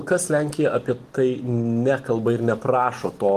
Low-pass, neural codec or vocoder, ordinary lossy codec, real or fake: 14.4 kHz; none; Opus, 24 kbps; real